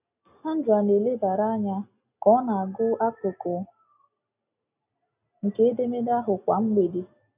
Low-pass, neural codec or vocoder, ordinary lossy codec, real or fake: 3.6 kHz; none; none; real